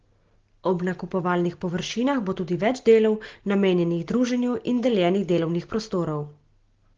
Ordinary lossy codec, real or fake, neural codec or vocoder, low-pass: Opus, 16 kbps; real; none; 7.2 kHz